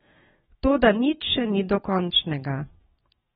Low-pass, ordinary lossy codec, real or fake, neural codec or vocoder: 19.8 kHz; AAC, 16 kbps; fake; autoencoder, 48 kHz, 128 numbers a frame, DAC-VAE, trained on Japanese speech